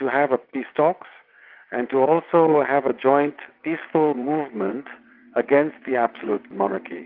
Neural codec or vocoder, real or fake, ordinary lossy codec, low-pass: vocoder, 22.05 kHz, 80 mel bands, WaveNeXt; fake; Opus, 24 kbps; 5.4 kHz